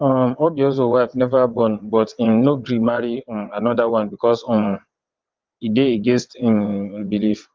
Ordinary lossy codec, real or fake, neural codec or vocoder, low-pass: Opus, 32 kbps; fake; vocoder, 22.05 kHz, 80 mel bands, WaveNeXt; 7.2 kHz